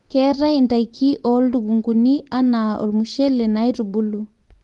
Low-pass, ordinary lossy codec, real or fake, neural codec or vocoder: 10.8 kHz; Opus, 24 kbps; fake; vocoder, 24 kHz, 100 mel bands, Vocos